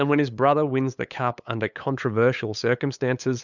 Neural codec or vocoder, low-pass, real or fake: codec, 16 kHz, 8 kbps, FunCodec, trained on LibriTTS, 25 frames a second; 7.2 kHz; fake